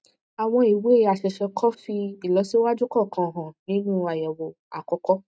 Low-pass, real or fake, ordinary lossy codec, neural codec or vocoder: none; real; none; none